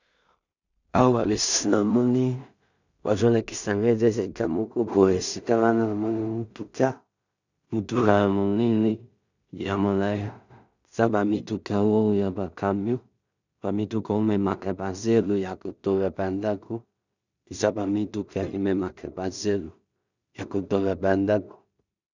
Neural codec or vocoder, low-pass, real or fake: codec, 16 kHz in and 24 kHz out, 0.4 kbps, LongCat-Audio-Codec, two codebook decoder; 7.2 kHz; fake